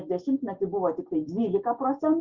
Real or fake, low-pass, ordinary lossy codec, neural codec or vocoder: real; 7.2 kHz; Opus, 64 kbps; none